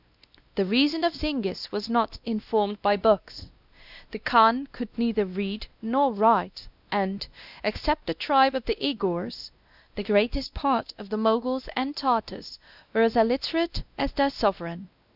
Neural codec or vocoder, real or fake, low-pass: codec, 16 kHz, 1 kbps, X-Codec, WavLM features, trained on Multilingual LibriSpeech; fake; 5.4 kHz